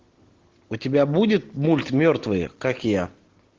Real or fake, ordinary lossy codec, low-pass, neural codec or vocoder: real; Opus, 16 kbps; 7.2 kHz; none